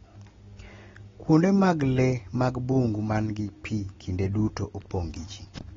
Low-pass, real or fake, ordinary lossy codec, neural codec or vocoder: 7.2 kHz; real; AAC, 24 kbps; none